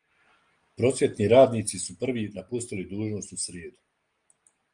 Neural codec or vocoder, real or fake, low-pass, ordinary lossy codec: none; real; 9.9 kHz; Opus, 32 kbps